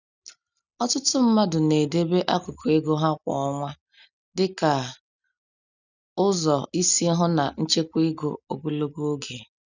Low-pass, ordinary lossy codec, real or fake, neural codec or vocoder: 7.2 kHz; none; real; none